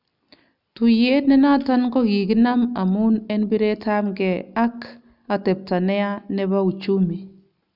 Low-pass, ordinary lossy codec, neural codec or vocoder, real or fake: 5.4 kHz; none; none; real